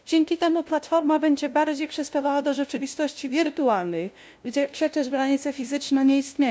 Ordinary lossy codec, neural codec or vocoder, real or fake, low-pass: none; codec, 16 kHz, 0.5 kbps, FunCodec, trained on LibriTTS, 25 frames a second; fake; none